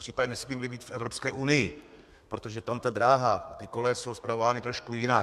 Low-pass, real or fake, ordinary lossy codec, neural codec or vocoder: 14.4 kHz; fake; MP3, 96 kbps; codec, 32 kHz, 1.9 kbps, SNAC